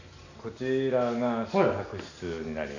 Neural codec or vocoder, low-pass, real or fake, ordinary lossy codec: none; 7.2 kHz; real; none